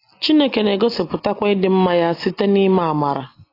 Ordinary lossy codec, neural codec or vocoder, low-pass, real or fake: AAC, 24 kbps; none; 5.4 kHz; real